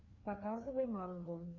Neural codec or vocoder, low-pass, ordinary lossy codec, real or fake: codec, 16 kHz, 2 kbps, FreqCodec, larger model; 7.2 kHz; Opus, 64 kbps; fake